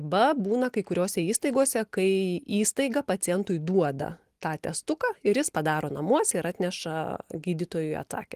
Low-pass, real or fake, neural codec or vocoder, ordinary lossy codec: 14.4 kHz; real; none; Opus, 24 kbps